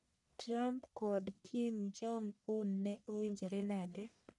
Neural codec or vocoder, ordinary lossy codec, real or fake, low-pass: codec, 44.1 kHz, 1.7 kbps, Pupu-Codec; none; fake; 10.8 kHz